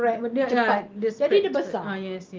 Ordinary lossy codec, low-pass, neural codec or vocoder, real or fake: Opus, 32 kbps; 7.2 kHz; none; real